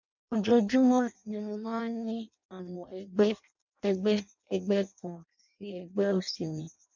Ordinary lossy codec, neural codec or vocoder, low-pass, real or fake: none; codec, 16 kHz in and 24 kHz out, 0.6 kbps, FireRedTTS-2 codec; 7.2 kHz; fake